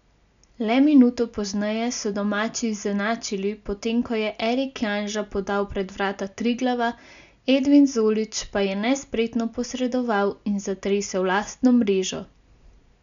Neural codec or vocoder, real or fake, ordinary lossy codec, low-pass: none; real; none; 7.2 kHz